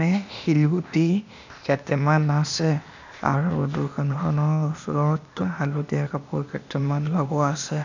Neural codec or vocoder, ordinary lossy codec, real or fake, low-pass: codec, 16 kHz, 0.8 kbps, ZipCodec; none; fake; 7.2 kHz